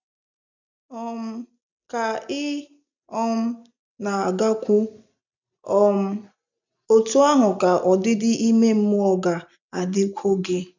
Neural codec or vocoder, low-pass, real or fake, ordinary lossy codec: none; 7.2 kHz; real; none